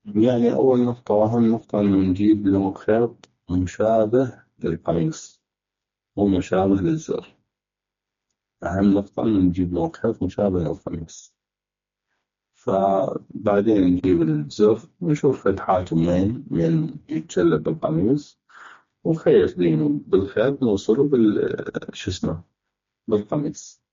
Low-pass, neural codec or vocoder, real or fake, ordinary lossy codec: 7.2 kHz; codec, 16 kHz, 2 kbps, FreqCodec, smaller model; fake; MP3, 48 kbps